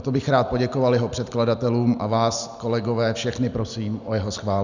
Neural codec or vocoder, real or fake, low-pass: none; real; 7.2 kHz